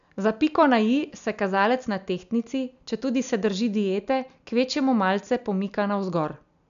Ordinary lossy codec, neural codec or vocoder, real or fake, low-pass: none; none; real; 7.2 kHz